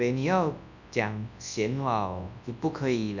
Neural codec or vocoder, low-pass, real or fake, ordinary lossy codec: codec, 24 kHz, 0.9 kbps, WavTokenizer, large speech release; 7.2 kHz; fake; none